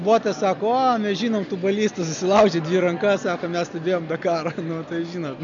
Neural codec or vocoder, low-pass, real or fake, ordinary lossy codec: none; 7.2 kHz; real; MP3, 64 kbps